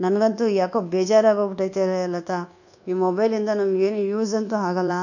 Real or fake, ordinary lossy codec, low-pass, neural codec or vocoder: fake; none; 7.2 kHz; codec, 24 kHz, 1.2 kbps, DualCodec